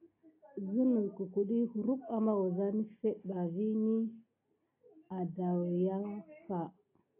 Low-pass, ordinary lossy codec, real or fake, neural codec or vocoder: 3.6 kHz; AAC, 24 kbps; real; none